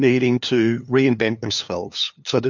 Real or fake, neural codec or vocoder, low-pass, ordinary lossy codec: fake; codec, 16 kHz, 2 kbps, FunCodec, trained on LibriTTS, 25 frames a second; 7.2 kHz; MP3, 48 kbps